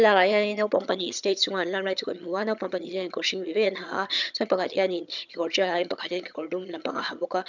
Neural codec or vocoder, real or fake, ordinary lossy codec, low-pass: vocoder, 22.05 kHz, 80 mel bands, HiFi-GAN; fake; none; 7.2 kHz